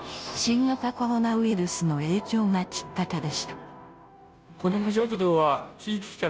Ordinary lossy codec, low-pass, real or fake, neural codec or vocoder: none; none; fake; codec, 16 kHz, 0.5 kbps, FunCodec, trained on Chinese and English, 25 frames a second